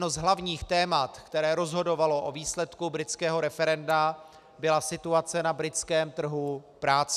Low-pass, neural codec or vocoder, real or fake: 14.4 kHz; none; real